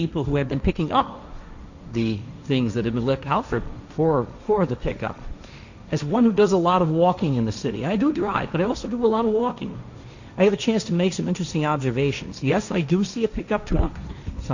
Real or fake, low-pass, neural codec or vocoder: fake; 7.2 kHz; codec, 16 kHz, 1.1 kbps, Voila-Tokenizer